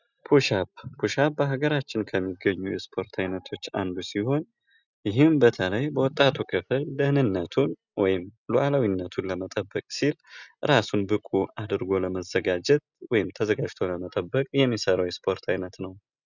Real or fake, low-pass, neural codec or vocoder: real; 7.2 kHz; none